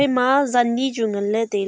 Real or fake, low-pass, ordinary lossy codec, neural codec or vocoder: real; none; none; none